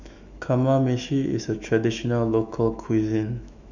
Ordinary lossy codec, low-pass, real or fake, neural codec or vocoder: none; 7.2 kHz; fake; autoencoder, 48 kHz, 128 numbers a frame, DAC-VAE, trained on Japanese speech